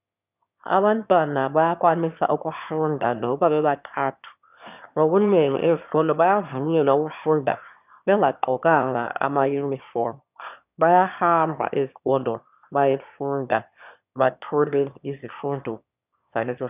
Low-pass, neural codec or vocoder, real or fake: 3.6 kHz; autoencoder, 22.05 kHz, a latent of 192 numbers a frame, VITS, trained on one speaker; fake